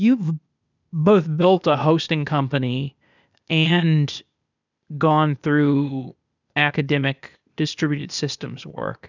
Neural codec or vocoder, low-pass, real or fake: codec, 16 kHz, 0.8 kbps, ZipCodec; 7.2 kHz; fake